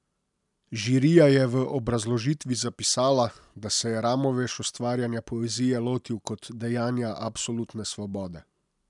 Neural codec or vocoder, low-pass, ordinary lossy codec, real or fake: none; 10.8 kHz; none; real